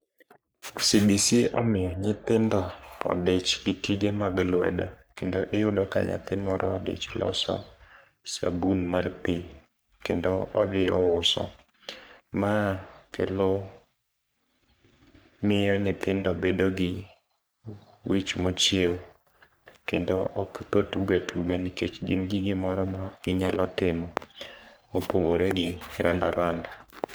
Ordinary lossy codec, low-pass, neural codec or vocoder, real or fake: none; none; codec, 44.1 kHz, 3.4 kbps, Pupu-Codec; fake